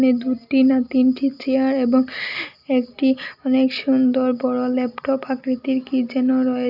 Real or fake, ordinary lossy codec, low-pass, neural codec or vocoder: real; none; 5.4 kHz; none